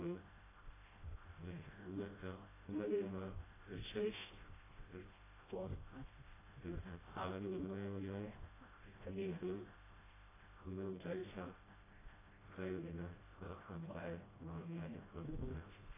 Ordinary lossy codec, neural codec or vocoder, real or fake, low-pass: AAC, 16 kbps; codec, 16 kHz, 0.5 kbps, FreqCodec, smaller model; fake; 3.6 kHz